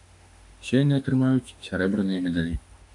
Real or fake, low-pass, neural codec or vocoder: fake; 10.8 kHz; autoencoder, 48 kHz, 32 numbers a frame, DAC-VAE, trained on Japanese speech